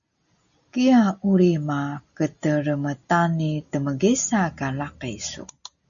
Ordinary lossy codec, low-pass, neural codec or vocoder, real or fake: MP3, 64 kbps; 7.2 kHz; none; real